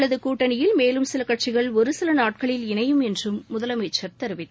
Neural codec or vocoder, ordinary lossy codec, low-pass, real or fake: none; none; none; real